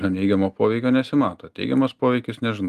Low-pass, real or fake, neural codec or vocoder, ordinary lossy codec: 14.4 kHz; real; none; Opus, 32 kbps